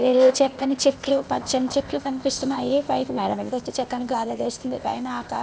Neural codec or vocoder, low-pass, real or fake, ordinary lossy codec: codec, 16 kHz, 0.8 kbps, ZipCodec; none; fake; none